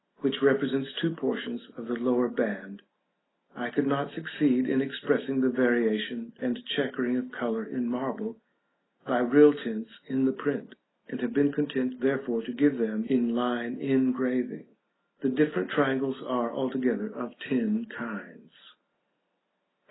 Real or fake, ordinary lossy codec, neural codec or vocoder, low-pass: real; AAC, 16 kbps; none; 7.2 kHz